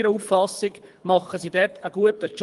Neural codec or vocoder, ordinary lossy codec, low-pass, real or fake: codec, 24 kHz, 3 kbps, HILCodec; Opus, 32 kbps; 10.8 kHz; fake